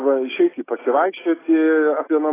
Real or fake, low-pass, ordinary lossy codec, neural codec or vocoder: real; 3.6 kHz; AAC, 16 kbps; none